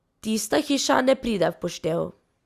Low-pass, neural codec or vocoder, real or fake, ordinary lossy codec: 14.4 kHz; none; real; Opus, 64 kbps